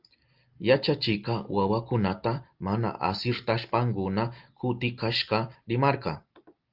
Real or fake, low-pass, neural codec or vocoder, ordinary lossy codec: real; 5.4 kHz; none; Opus, 32 kbps